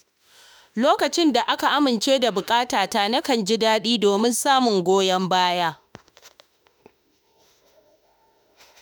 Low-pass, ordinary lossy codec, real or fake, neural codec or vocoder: none; none; fake; autoencoder, 48 kHz, 32 numbers a frame, DAC-VAE, trained on Japanese speech